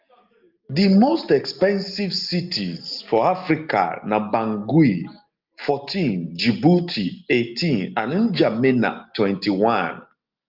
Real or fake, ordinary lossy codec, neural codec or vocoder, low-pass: real; Opus, 32 kbps; none; 5.4 kHz